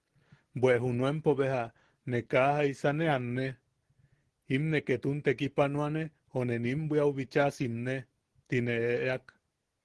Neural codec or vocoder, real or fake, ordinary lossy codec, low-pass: none; real; Opus, 16 kbps; 10.8 kHz